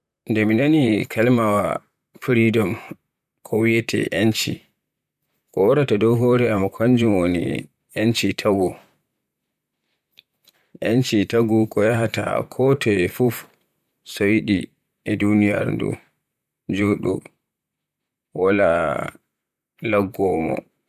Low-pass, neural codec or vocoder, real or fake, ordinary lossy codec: 14.4 kHz; vocoder, 44.1 kHz, 128 mel bands, Pupu-Vocoder; fake; none